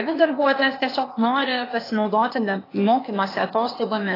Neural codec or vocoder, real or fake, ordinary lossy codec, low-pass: codec, 16 kHz, 0.8 kbps, ZipCodec; fake; AAC, 24 kbps; 5.4 kHz